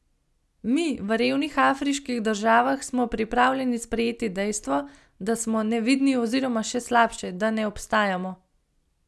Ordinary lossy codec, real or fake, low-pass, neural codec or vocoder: none; real; none; none